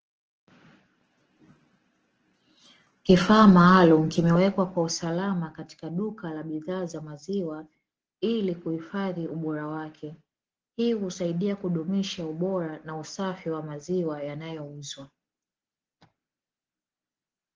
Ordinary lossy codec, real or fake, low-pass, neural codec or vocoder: Opus, 16 kbps; real; 7.2 kHz; none